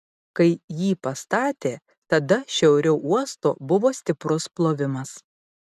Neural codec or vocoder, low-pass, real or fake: none; 14.4 kHz; real